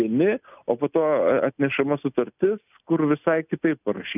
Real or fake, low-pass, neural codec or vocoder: real; 3.6 kHz; none